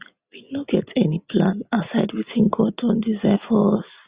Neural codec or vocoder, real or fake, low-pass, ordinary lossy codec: none; real; 3.6 kHz; Opus, 64 kbps